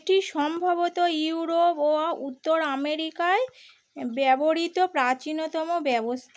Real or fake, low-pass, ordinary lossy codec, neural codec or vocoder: real; none; none; none